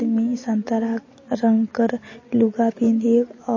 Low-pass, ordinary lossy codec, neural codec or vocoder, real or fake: 7.2 kHz; MP3, 32 kbps; none; real